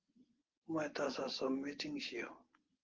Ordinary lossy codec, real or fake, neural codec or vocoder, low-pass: Opus, 16 kbps; real; none; 7.2 kHz